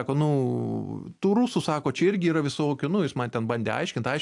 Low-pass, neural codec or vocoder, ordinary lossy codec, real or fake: 10.8 kHz; none; AAC, 64 kbps; real